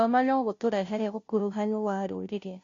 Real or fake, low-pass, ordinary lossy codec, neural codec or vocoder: fake; 7.2 kHz; AAC, 48 kbps; codec, 16 kHz, 0.5 kbps, FunCodec, trained on Chinese and English, 25 frames a second